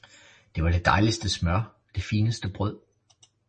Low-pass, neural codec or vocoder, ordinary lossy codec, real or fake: 10.8 kHz; none; MP3, 32 kbps; real